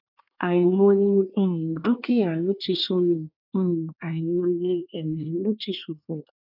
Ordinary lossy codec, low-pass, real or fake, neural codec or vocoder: none; 5.4 kHz; fake; codec, 24 kHz, 1 kbps, SNAC